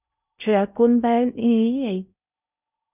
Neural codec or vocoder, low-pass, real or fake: codec, 16 kHz in and 24 kHz out, 0.6 kbps, FocalCodec, streaming, 2048 codes; 3.6 kHz; fake